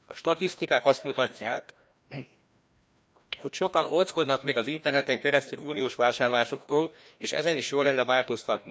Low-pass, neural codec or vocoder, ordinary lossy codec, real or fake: none; codec, 16 kHz, 1 kbps, FreqCodec, larger model; none; fake